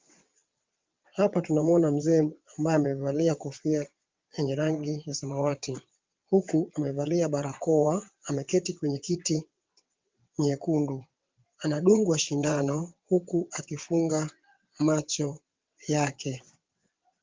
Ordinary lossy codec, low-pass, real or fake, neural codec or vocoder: Opus, 24 kbps; 7.2 kHz; fake; vocoder, 22.05 kHz, 80 mel bands, WaveNeXt